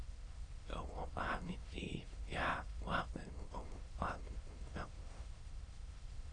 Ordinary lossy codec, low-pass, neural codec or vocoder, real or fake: AAC, 32 kbps; 9.9 kHz; autoencoder, 22.05 kHz, a latent of 192 numbers a frame, VITS, trained on many speakers; fake